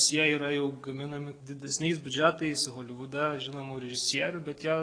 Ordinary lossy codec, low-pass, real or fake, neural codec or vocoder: AAC, 32 kbps; 9.9 kHz; fake; codec, 44.1 kHz, 7.8 kbps, DAC